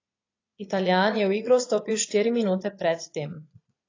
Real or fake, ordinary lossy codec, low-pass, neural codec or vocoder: fake; AAC, 32 kbps; 7.2 kHz; vocoder, 22.05 kHz, 80 mel bands, Vocos